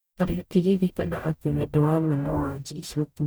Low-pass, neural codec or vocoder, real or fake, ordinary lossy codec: none; codec, 44.1 kHz, 0.9 kbps, DAC; fake; none